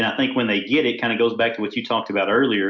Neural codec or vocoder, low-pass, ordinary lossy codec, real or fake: none; 7.2 kHz; MP3, 64 kbps; real